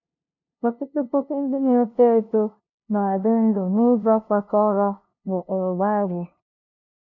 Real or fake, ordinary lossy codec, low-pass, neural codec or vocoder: fake; none; 7.2 kHz; codec, 16 kHz, 0.5 kbps, FunCodec, trained on LibriTTS, 25 frames a second